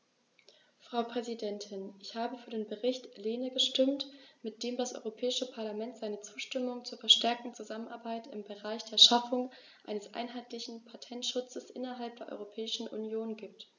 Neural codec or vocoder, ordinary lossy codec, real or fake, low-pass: none; none; real; 7.2 kHz